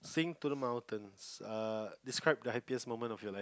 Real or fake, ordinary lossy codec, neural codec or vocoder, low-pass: real; none; none; none